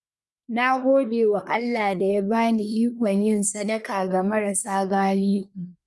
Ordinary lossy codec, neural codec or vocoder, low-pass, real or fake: none; codec, 24 kHz, 1 kbps, SNAC; none; fake